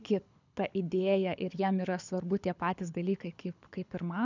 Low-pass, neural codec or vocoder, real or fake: 7.2 kHz; codec, 24 kHz, 6 kbps, HILCodec; fake